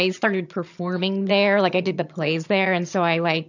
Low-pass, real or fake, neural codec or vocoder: 7.2 kHz; fake; vocoder, 22.05 kHz, 80 mel bands, HiFi-GAN